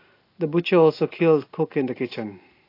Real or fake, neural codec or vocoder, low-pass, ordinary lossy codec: real; none; 5.4 kHz; AAC, 32 kbps